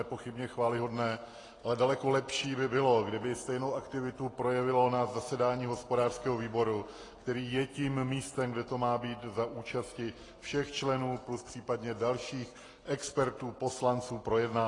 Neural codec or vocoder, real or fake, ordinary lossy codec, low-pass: none; real; AAC, 32 kbps; 10.8 kHz